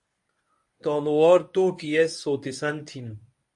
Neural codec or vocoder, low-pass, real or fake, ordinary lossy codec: codec, 24 kHz, 0.9 kbps, WavTokenizer, medium speech release version 1; 10.8 kHz; fake; MP3, 48 kbps